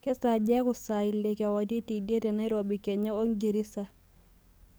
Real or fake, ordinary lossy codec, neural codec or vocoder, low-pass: fake; none; codec, 44.1 kHz, 7.8 kbps, DAC; none